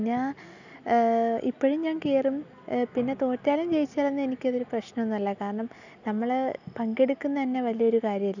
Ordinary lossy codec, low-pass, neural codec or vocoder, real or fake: none; 7.2 kHz; none; real